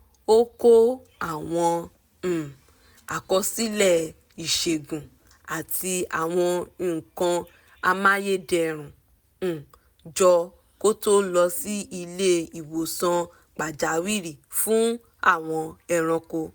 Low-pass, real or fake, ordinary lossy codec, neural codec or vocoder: none; real; none; none